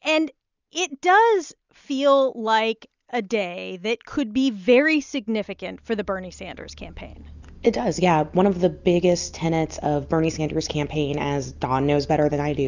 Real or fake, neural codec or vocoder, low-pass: real; none; 7.2 kHz